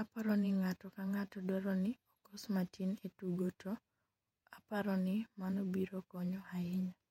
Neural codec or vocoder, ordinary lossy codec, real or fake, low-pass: vocoder, 48 kHz, 128 mel bands, Vocos; MP3, 64 kbps; fake; 19.8 kHz